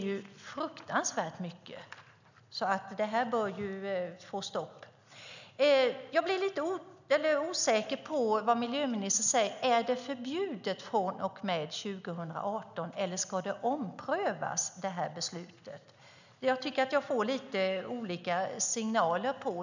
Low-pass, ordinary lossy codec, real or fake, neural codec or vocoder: 7.2 kHz; none; real; none